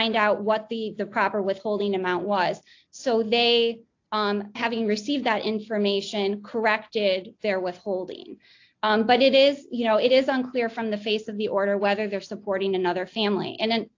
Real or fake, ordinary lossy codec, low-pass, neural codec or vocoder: real; AAC, 48 kbps; 7.2 kHz; none